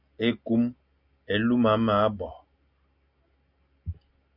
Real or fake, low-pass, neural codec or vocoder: real; 5.4 kHz; none